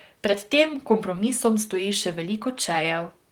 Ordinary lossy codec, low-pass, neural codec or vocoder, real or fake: Opus, 24 kbps; 19.8 kHz; vocoder, 44.1 kHz, 128 mel bands, Pupu-Vocoder; fake